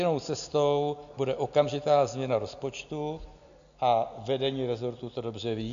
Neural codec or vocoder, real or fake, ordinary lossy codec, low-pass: none; real; AAC, 96 kbps; 7.2 kHz